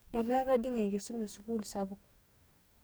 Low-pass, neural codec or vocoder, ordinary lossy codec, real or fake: none; codec, 44.1 kHz, 2.6 kbps, DAC; none; fake